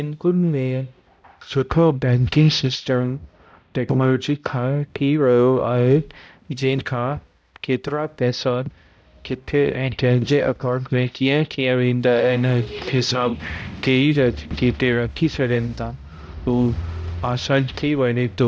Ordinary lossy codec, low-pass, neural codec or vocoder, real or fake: none; none; codec, 16 kHz, 0.5 kbps, X-Codec, HuBERT features, trained on balanced general audio; fake